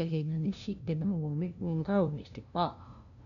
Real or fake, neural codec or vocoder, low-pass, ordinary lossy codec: fake; codec, 16 kHz, 0.5 kbps, FunCodec, trained on LibriTTS, 25 frames a second; 7.2 kHz; none